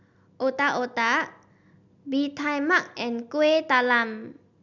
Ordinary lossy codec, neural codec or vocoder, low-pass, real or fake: none; none; 7.2 kHz; real